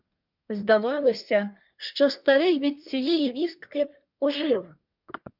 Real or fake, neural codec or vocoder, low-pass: fake; codec, 24 kHz, 1 kbps, SNAC; 5.4 kHz